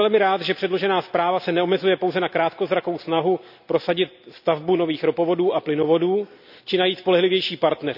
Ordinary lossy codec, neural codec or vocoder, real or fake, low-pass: none; none; real; 5.4 kHz